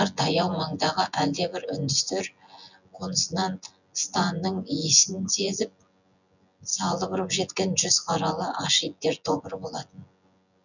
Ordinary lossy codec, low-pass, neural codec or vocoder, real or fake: none; 7.2 kHz; vocoder, 24 kHz, 100 mel bands, Vocos; fake